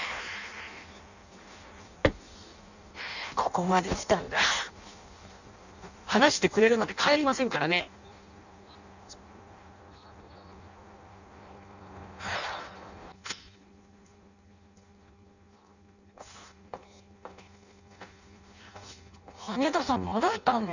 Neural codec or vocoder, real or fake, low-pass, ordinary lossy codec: codec, 16 kHz in and 24 kHz out, 0.6 kbps, FireRedTTS-2 codec; fake; 7.2 kHz; none